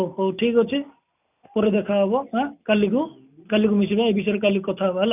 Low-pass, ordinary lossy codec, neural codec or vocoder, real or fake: 3.6 kHz; none; none; real